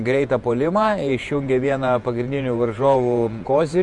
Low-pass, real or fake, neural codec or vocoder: 10.8 kHz; fake; vocoder, 48 kHz, 128 mel bands, Vocos